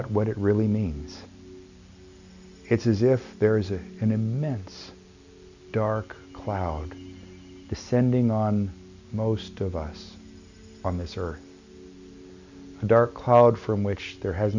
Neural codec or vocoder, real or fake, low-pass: none; real; 7.2 kHz